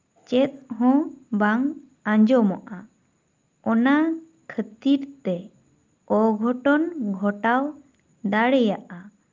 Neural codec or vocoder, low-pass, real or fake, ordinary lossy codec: none; 7.2 kHz; real; Opus, 32 kbps